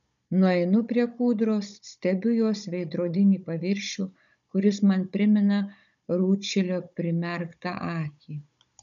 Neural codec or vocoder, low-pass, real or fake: codec, 16 kHz, 16 kbps, FunCodec, trained on Chinese and English, 50 frames a second; 7.2 kHz; fake